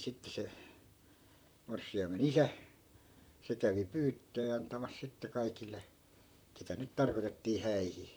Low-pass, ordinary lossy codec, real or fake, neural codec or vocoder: none; none; fake; vocoder, 44.1 kHz, 128 mel bands, Pupu-Vocoder